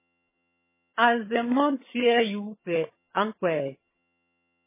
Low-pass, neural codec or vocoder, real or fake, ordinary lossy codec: 3.6 kHz; vocoder, 22.05 kHz, 80 mel bands, HiFi-GAN; fake; MP3, 16 kbps